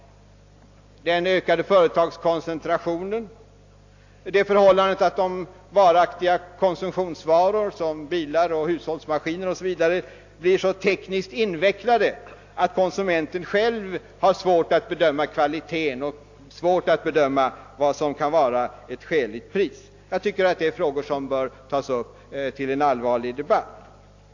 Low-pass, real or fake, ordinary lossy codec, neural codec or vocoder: 7.2 kHz; real; AAC, 48 kbps; none